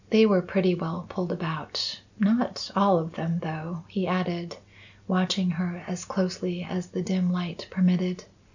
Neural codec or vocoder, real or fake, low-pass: none; real; 7.2 kHz